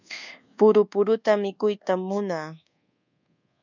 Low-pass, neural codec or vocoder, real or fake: 7.2 kHz; codec, 24 kHz, 1.2 kbps, DualCodec; fake